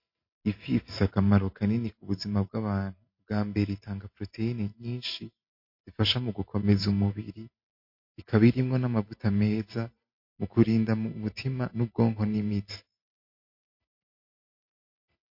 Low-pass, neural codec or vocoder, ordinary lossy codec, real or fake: 5.4 kHz; vocoder, 44.1 kHz, 128 mel bands every 512 samples, BigVGAN v2; MP3, 32 kbps; fake